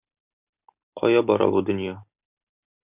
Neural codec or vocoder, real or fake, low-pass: none; real; 3.6 kHz